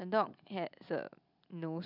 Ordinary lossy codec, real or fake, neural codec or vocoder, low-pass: none; real; none; 5.4 kHz